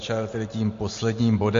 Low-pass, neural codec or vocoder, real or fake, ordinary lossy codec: 7.2 kHz; none; real; MP3, 48 kbps